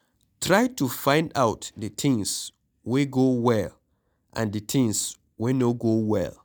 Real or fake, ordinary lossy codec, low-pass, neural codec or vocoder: real; none; none; none